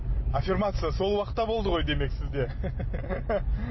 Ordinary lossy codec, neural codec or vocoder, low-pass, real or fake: MP3, 24 kbps; none; 7.2 kHz; real